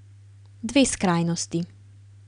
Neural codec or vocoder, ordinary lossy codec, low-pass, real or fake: none; none; 9.9 kHz; real